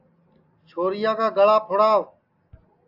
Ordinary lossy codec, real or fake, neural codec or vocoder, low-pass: AAC, 48 kbps; real; none; 5.4 kHz